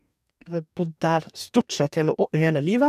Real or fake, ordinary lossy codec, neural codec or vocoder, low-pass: fake; none; codec, 44.1 kHz, 2.6 kbps, DAC; 14.4 kHz